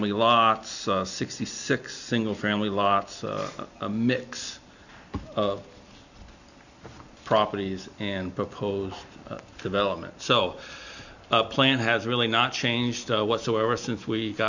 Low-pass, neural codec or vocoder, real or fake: 7.2 kHz; none; real